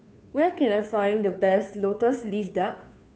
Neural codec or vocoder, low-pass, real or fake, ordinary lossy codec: codec, 16 kHz, 2 kbps, FunCodec, trained on Chinese and English, 25 frames a second; none; fake; none